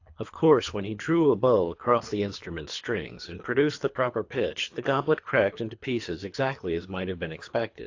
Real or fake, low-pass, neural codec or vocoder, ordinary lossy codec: fake; 7.2 kHz; codec, 24 kHz, 3 kbps, HILCodec; AAC, 48 kbps